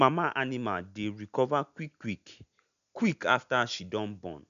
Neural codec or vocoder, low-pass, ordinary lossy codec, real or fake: none; 7.2 kHz; none; real